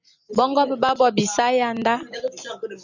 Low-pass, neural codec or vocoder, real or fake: 7.2 kHz; none; real